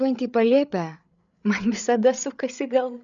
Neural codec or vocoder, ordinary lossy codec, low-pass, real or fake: codec, 16 kHz, 8 kbps, FreqCodec, larger model; MP3, 96 kbps; 7.2 kHz; fake